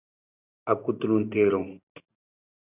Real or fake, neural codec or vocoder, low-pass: real; none; 3.6 kHz